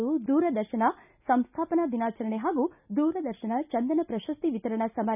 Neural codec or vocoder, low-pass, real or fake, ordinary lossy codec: none; 3.6 kHz; real; none